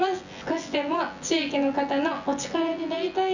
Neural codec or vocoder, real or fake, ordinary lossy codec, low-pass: vocoder, 24 kHz, 100 mel bands, Vocos; fake; none; 7.2 kHz